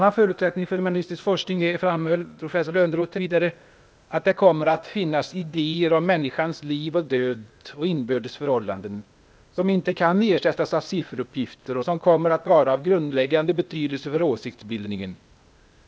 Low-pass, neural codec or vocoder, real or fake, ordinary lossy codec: none; codec, 16 kHz, 0.8 kbps, ZipCodec; fake; none